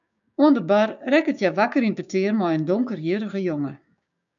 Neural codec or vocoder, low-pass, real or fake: codec, 16 kHz, 6 kbps, DAC; 7.2 kHz; fake